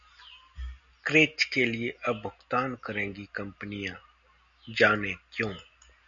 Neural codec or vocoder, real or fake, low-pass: none; real; 7.2 kHz